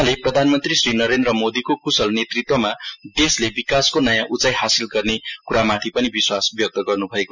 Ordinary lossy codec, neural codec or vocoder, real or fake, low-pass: none; none; real; 7.2 kHz